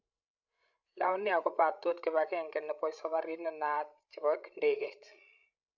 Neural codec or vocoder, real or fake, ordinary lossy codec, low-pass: codec, 16 kHz, 16 kbps, FreqCodec, larger model; fake; none; 7.2 kHz